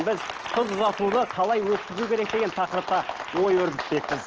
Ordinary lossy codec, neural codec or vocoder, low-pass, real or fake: Opus, 16 kbps; none; 7.2 kHz; real